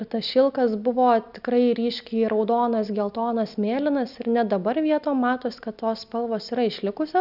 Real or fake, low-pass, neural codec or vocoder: real; 5.4 kHz; none